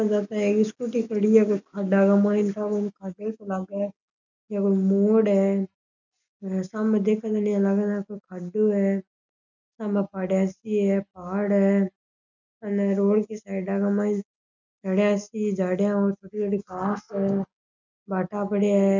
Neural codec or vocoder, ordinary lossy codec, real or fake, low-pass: none; none; real; 7.2 kHz